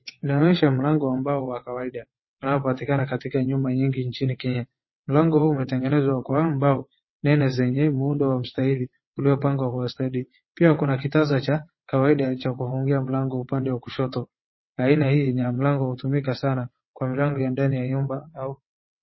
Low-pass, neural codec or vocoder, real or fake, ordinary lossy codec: 7.2 kHz; vocoder, 22.05 kHz, 80 mel bands, WaveNeXt; fake; MP3, 24 kbps